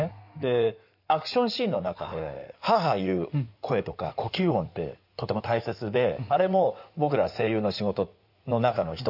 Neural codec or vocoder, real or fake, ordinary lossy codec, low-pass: codec, 16 kHz in and 24 kHz out, 2.2 kbps, FireRedTTS-2 codec; fake; none; 5.4 kHz